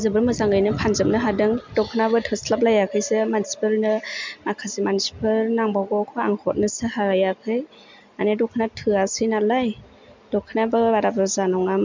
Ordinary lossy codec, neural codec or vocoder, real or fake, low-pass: MP3, 64 kbps; none; real; 7.2 kHz